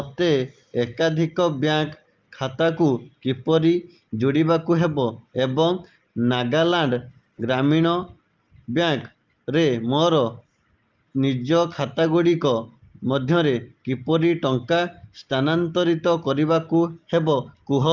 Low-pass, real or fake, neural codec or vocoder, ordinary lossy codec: 7.2 kHz; real; none; Opus, 24 kbps